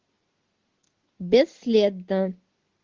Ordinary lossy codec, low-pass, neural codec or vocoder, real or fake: Opus, 16 kbps; 7.2 kHz; none; real